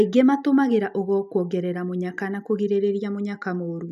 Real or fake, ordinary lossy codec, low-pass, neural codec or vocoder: real; none; 14.4 kHz; none